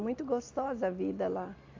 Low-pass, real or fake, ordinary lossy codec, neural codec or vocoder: 7.2 kHz; real; AAC, 48 kbps; none